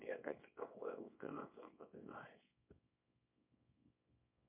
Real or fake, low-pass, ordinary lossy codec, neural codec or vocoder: fake; 3.6 kHz; MP3, 32 kbps; codec, 24 kHz, 0.9 kbps, WavTokenizer, small release